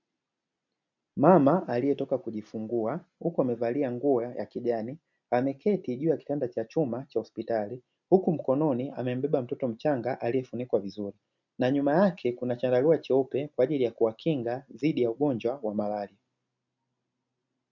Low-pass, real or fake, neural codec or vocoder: 7.2 kHz; real; none